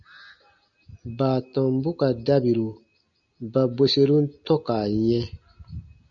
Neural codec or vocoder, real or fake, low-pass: none; real; 7.2 kHz